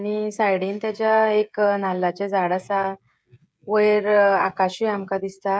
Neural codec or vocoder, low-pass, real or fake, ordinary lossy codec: codec, 16 kHz, 16 kbps, FreqCodec, smaller model; none; fake; none